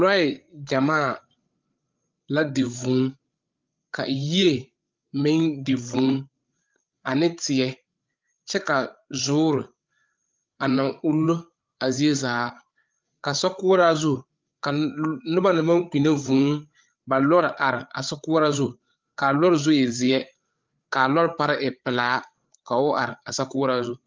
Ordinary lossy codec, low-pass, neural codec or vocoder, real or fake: Opus, 32 kbps; 7.2 kHz; codec, 16 kHz, 8 kbps, FreqCodec, larger model; fake